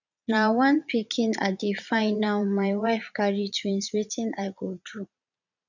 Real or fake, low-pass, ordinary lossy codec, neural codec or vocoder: fake; 7.2 kHz; none; vocoder, 22.05 kHz, 80 mel bands, Vocos